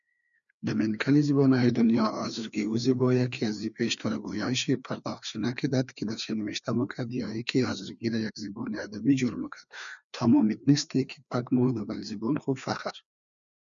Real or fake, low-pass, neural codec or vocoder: fake; 7.2 kHz; codec, 16 kHz, 2 kbps, FreqCodec, larger model